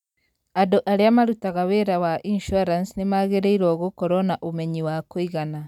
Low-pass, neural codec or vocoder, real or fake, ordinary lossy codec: 19.8 kHz; none; real; none